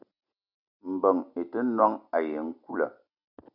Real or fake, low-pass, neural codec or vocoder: real; 5.4 kHz; none